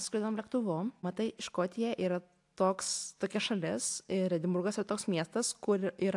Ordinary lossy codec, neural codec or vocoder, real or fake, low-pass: AAC, 64 kbps; none; real; 10.8 kHz